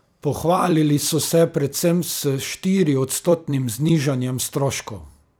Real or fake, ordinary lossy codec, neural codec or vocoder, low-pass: fake; none; vocoder, 44.1 kHz, 128 mel bands every 256 samples, BigVGAN v2; none